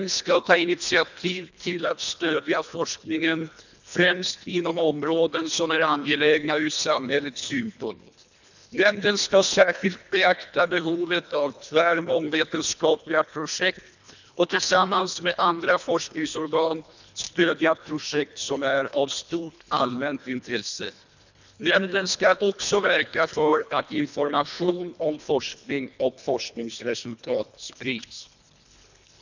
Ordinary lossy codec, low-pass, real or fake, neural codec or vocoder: none; 7.2 kHz; fake; codec, 24 kHz, 1.5 kbps, HILCodec